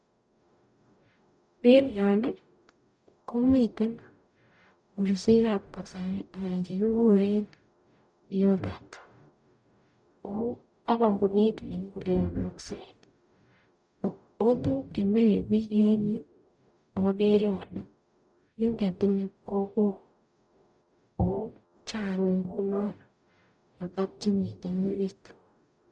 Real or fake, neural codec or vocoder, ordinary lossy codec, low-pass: fake; codec, 44.1 kHz, 0.9 kbps, DAC; none; 9.9 kHz